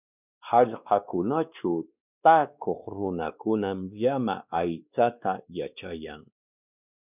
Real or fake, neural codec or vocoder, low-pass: fake; codec, 16 kHz, 2 kbps, X-Codec, WavLM features, trained on Multilingual LibriSpeech; 3.6 kHz